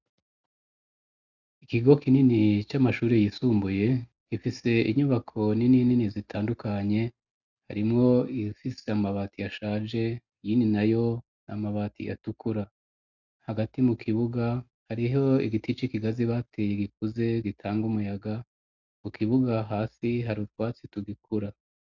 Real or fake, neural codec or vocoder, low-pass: real; none; 7.2 kHz